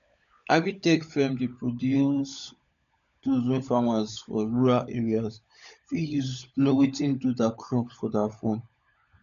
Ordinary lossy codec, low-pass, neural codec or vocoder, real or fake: none; 7.2 kHz; codec, 16 kHz, 16 kbps, FunCodec, trained on LibriTTS, 50 frames a second; fake